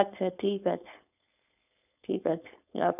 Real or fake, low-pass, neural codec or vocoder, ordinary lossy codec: fake; 3.6 kHz; codec, 16 kHz, 4.8 kbps, FACodec; none